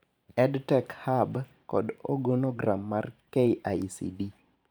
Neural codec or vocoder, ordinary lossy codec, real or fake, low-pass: vocoder, 44.1 kHz, 128 mel bands every 512 samples, BigVGAN v2; none; fake; none